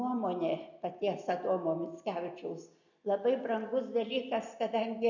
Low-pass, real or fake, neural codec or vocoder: 7.2 kHz; real; none